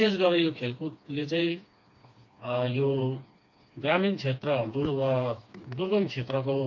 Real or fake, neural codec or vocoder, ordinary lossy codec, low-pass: fake; codec, 16 kHz, 2 kbps, FreqCodec, smaller model; MP3, 48 kbps; 7.2 kHz